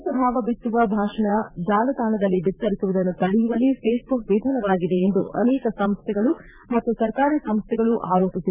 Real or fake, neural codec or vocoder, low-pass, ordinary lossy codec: fake; vocoder, 44.1 kHz, 80 mel bands, Vocos; 3.6 kHz; none